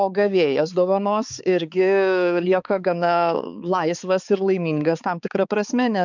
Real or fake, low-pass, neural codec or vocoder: fake; 7.2 kHz; codec, 16 kHz, 4 kbps, X-Codec, HuBERT features, trained on balanced general audio